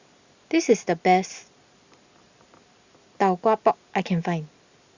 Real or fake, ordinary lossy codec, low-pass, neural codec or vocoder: real; Opus, 64 kbps; 7.2 kHz; none